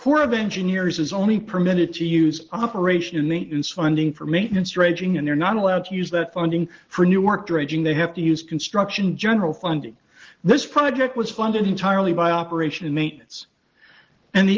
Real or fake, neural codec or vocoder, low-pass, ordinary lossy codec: real; none; 7.2 kHz; Opus, 16 kbps